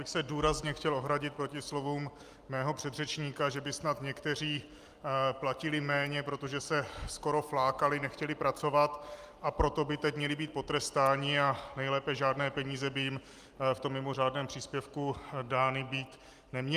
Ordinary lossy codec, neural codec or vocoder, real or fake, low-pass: Opus, 32 kbps; none; real; 14.4 kHz